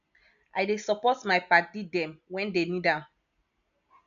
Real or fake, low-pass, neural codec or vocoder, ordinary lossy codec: real; 7.2 kHz; none; none